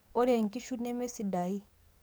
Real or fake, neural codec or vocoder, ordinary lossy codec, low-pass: fake; codec, 44.1 kHz, 7.8 kbps, DAC; none; none